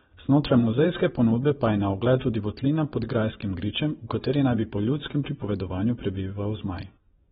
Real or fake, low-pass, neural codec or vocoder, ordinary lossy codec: fake; 10.8 kHz; vocoder, 24 kHz, 100 mel bands, Vocos; AAC, 16 kbps